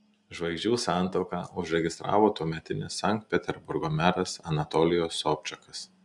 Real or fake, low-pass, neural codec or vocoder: real; 10.8 kHz; none